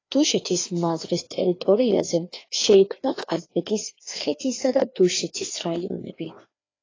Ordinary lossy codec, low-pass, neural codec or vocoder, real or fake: AAC, 32 kbps; 7.2 kHz; codec, 16 kHz, 2 kbps, FreqCodec, larger model; fake